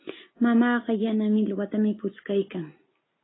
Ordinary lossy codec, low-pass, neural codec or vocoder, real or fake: AAC, 16 kbps; 7.2 kHz; none; real